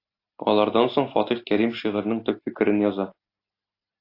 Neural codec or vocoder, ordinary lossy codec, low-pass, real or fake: none; AAC, 32 kbps; 5.4 kHz; real